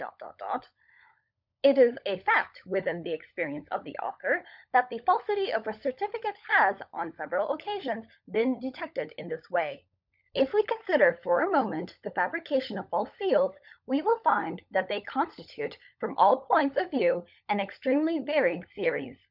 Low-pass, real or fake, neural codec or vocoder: 5.4 kHz; fake; codec, 16 kHz, 16 kbps, FunCodec, trained on LibriTTS, 50 frames a second